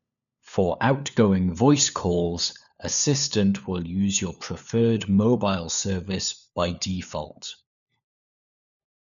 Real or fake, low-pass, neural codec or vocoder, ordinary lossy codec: fake; 7.2 kHz; codec, 16 kHz, 4 kbps, FunCodec, trained on LibriTTS, 50 frames a second; none